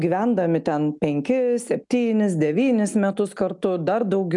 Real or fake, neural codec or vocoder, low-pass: real; none; 10.8 kHz